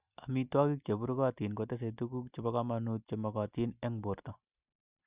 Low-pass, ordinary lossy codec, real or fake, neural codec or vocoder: 3.6 kHz; Opus, 64 kbps; real; none